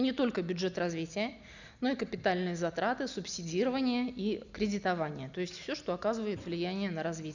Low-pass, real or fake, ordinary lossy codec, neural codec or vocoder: 7.2 kHz; real; none; none